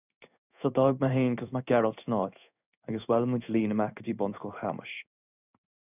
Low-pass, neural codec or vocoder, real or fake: 3.6 kHz; codec, 16 kHz in and 24 kHz out, 1 kbps, XY-Tokenizer; fake